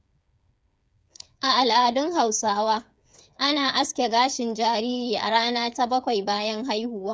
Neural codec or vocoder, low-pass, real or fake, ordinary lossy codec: codec, 16 kHz, 8 kbps, FreqCodec, smaller model; none; fake; none